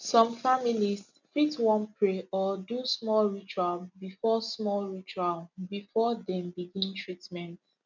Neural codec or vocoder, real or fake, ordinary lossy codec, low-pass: none; real; none; 7.2 kHz